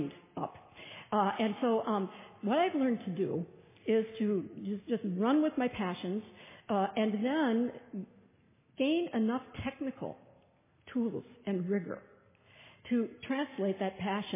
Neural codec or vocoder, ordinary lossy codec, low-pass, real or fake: none; MP3, 16 kbps; 3.6 kHz; real